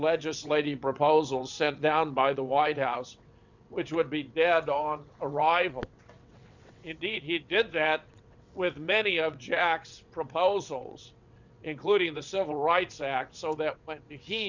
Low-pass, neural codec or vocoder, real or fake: 7.2 kHz; vocoder, 22.05 kHz, 80 mel bands, WaveNeXt; fake